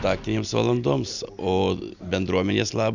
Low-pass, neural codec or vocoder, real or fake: 7.2 kHz; none; real